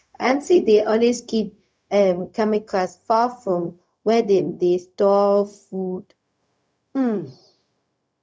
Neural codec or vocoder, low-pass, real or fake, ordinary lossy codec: codec, 16 kHz, 0.4 kbps, LongCat-Audio-Codec; none; fake; none